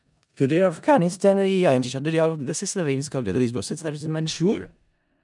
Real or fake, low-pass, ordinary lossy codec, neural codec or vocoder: fake; 10.8 kHz; none; codec, 16 kHz in and 24 kHz out, 0.4 kbps, LongCat-Audio-Codec, four codebook decoder